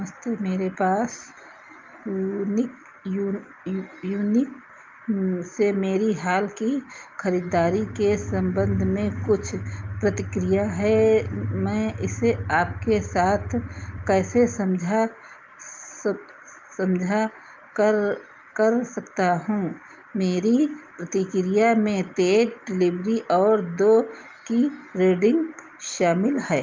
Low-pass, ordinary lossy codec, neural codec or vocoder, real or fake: 7.2 kHz; Opus, 24 kbps; none; real